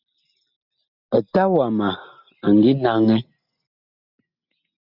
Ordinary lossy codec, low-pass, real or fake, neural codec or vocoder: Opus, 64 kbps; 5.4 kHz; real; none